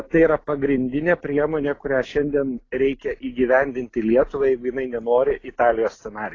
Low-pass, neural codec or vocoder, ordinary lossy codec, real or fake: 7.2 kHz; none; AAC, 32 kbps; real